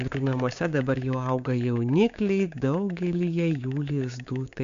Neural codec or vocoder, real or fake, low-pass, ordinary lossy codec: codec, 16 kHz, 6 kbps, DAC; fake; 7.2 kHz; MP3, 64 kbps